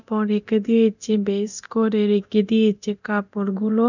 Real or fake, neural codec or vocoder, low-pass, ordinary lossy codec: fake; codec, 24 kHz, 0.9 kbps, DualCodec; 7.2 kHz; none